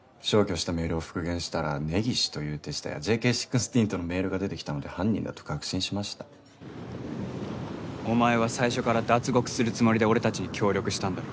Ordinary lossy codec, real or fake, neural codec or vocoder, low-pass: none; real; none; none